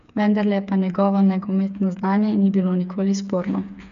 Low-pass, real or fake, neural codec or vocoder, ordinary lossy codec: 7.2 kHz; fake; codec, 16 kHz, 4 kbps, FreqCodec, smaller model; none